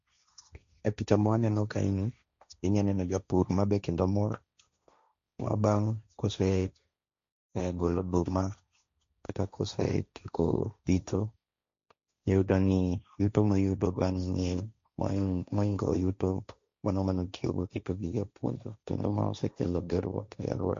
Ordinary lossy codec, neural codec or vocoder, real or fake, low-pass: MP3, 48 kbps; codec, 16 kHz, 1.1 kbps, Voila-Tokenizer; fake; 7.2 kHz